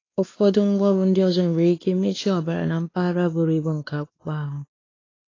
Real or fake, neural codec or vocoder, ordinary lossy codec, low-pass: fake; codec, 16 kHz, 2 kbps, X-Codec, WavLM features, trained on Multilingual LibriSpeech; AAC, 32 kbps; 7.2 kHz